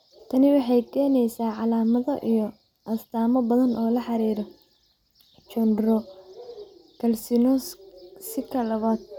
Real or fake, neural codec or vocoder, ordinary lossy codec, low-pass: real; none; none; 19.8 kHz